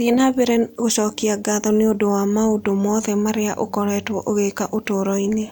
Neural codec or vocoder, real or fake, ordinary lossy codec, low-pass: none; real; none; none